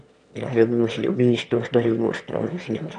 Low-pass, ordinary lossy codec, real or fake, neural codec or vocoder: 9.9 kHz; none; fake; autoencoder, 22.05 kHz, a latent of 192 numbers a frame, VITS, trained on one speaker